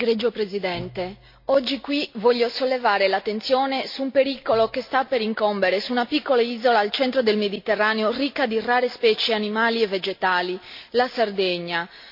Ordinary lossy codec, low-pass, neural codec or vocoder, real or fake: MP3, 32 kbps; 5.4 kHz; none; real